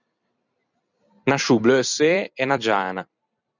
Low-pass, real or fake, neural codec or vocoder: 7.2 kHz; fake; vocoder, 44.1 kHz, 128 mel bands every 256 samples, BigVGAN v2